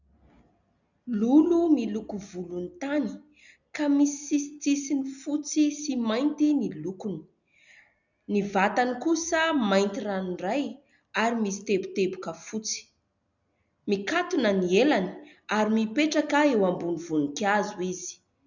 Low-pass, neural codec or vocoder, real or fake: 7.2 kHz; none; real